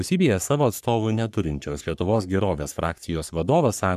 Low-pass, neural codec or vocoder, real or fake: 14.4 kHz; codec, 44.1 kHz, 3.4 kbps, Pupu-Codec; fake